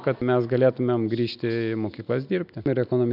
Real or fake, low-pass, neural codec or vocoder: real; 5.4 kHz; none